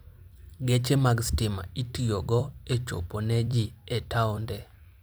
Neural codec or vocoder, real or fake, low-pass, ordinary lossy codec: vocoder, 44.1 kHz, 128 mel bands every 256 samples, BigVGAN v2; fake; none; none